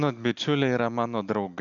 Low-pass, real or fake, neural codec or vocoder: 7.2 kHz; real; none